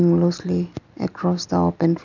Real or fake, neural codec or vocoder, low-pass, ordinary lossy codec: real; none; 7.2 kHz; none